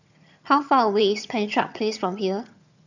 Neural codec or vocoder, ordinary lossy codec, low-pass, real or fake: vocoder, 22.05 kHz, 80 mel bands, HiFi-GAN; none; 7.2 kHz; fake